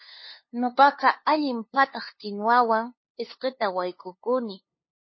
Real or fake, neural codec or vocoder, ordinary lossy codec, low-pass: fake; codec, 16 kHz, 2 kbps, FunCodec, trained on LibriTTS, 25 frames a second; MP3, 24 kbps; 7.2 kHz